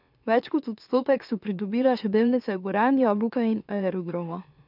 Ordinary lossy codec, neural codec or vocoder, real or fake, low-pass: none; autoencoder, 44.1 kHz, a latent of 192 numbers a frame, MeloTTS; fake; 5.4 kHz